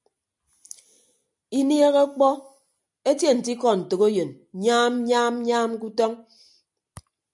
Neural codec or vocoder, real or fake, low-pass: none; real; 10.8 kHz